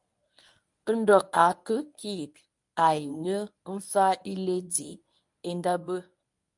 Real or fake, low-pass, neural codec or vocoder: fake; 10.8 kHz; codec, 24 kHz, 0.9 kbps, WavTokenizer, medium speech release version 1